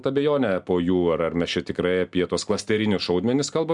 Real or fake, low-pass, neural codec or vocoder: real; 10.8 kHz; none